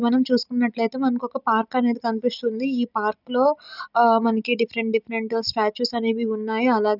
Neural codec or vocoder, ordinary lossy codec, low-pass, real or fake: none; none; 5.4 kHz; real